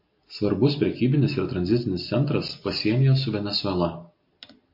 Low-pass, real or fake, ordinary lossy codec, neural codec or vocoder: 5.4 kHz; real; MP3, 32 kbps; none